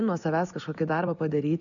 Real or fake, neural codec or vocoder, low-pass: real; none; 7.2 kHz